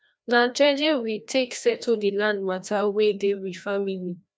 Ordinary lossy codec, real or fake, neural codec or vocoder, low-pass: none; fake; codec, 16 kHz, 1 kbps, FreqCodec, larger model; none